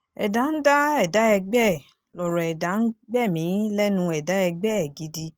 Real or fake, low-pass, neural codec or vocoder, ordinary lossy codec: real; 19.8 kHz; none; Opus, 24 kbps